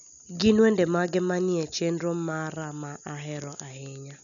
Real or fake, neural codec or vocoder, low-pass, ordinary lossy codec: real; none; 7.2 kHz; none